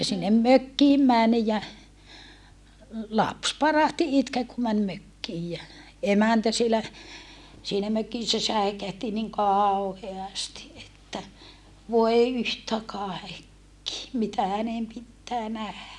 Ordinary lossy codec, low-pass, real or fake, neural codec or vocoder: none; none; real; none